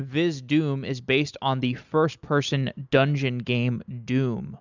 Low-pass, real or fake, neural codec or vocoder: 7.2 kHz; real; none